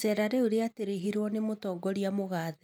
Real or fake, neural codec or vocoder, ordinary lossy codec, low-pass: real; none; none; none